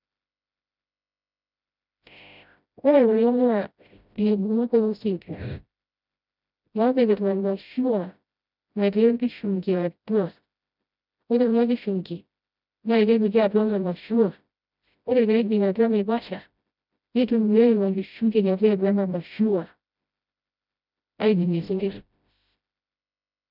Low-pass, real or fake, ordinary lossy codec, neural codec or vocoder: 5.4 kHz; fake; none; codec, 16 kHz, 0.5 kbps, FreqCodec, smaller model